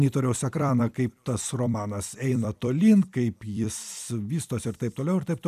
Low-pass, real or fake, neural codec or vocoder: 14.4 kHz; fake; vocoder, 44.1 kHz, 128 mel bands every 256 samples, BigVGAN v2